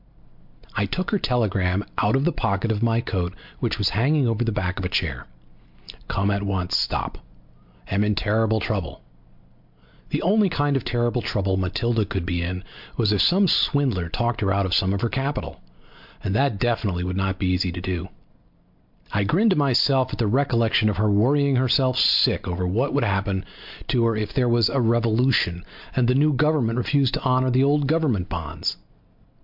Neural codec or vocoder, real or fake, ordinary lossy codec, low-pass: none; real; MP3, 48 kbps; 5.4 kHz